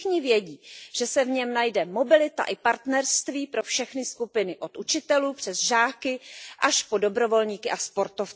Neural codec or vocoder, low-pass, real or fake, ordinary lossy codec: none; none; real; none